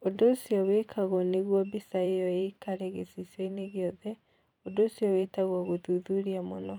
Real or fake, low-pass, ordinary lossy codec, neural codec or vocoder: fake; 19.8 kHz; none; vocoder, 44.1 kHz, 128 mel bands every 512 samples, BigVGAN v2